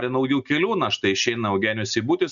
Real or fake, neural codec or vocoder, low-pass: real; none; 7.2 kHz